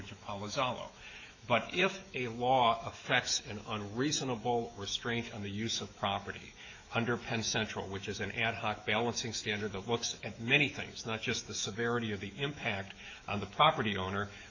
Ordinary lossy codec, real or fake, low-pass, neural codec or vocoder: AAC, 48 kbps; fake; 7.2 kHz; autoencoder, 48 kHz, 128 numbers a frame, DAC-VAE, trained on Japanese speech